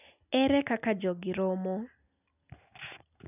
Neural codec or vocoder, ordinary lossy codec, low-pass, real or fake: none; none; 3.6 kHz; real